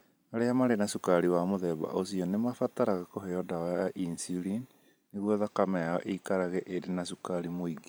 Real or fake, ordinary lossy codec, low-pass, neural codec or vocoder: fake; none; none; vocoder, 44.1 kHz, 128 mel bands every 512 samples, BigVGAN v2